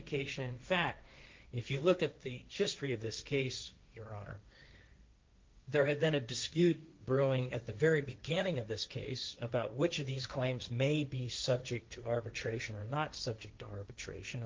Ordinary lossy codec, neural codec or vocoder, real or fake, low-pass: Opus, 32 kbps; codec, 16 kHz, 1.1 kbps, Voila-Tokenizer; fake; 7.2 kHz